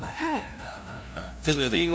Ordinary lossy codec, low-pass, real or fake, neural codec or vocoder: none; none; fake; codec, 16 kHz, 0.5 kbps, FunCodec, trained on LibriTTS, 25 frames a second